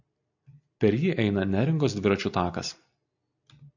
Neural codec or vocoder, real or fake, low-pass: none; real; 7.2 kHz